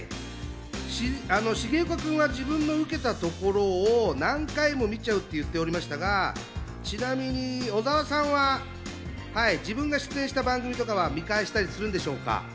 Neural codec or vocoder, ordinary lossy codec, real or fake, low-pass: none; none; real; none